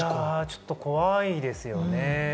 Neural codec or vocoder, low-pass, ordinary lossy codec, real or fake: none; none; none; real